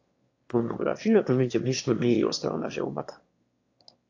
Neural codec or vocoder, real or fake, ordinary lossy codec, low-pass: autoencoder, 22.05 kHz, a latent of 192 numbers a frame, VITS, trained on one speaker; fake; AAC, 48 kbps; 7.2 kHz